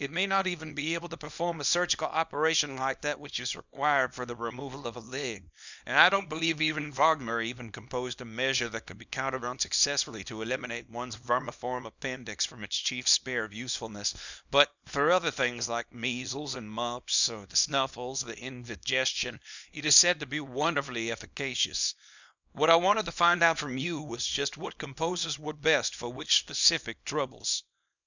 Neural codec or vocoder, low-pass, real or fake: codec, 24 kHz, 0.9 kbps, WavTokenizer, small release; 7.2 kHz; fake